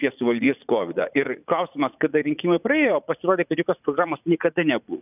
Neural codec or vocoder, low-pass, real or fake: vocoder, 44.1 kHz, 80 mel bands, Vocos; 3.6 kHz; fake